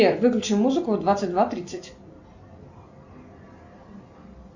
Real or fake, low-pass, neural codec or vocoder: real; 7.2 kHz; none